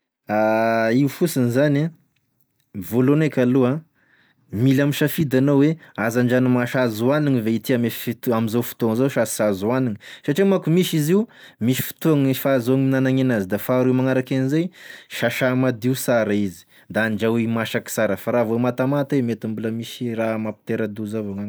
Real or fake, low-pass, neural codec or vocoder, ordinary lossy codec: real; none; none; none